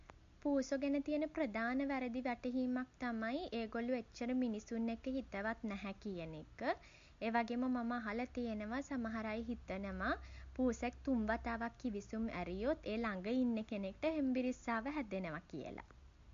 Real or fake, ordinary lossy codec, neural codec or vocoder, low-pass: real; MP3, 48 kbps; none; 7.2 kHz